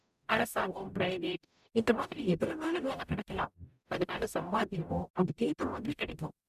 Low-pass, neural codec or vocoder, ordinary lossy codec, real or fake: 14.4 kHz; codec, 44.1 kHz, 0.9 kbps, DAC; none; fake